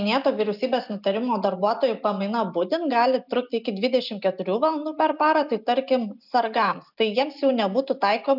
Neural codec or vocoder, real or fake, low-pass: none; real; 5.4 kHz